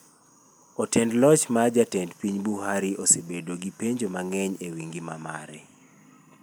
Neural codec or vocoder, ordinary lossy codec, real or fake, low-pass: none; none; real; none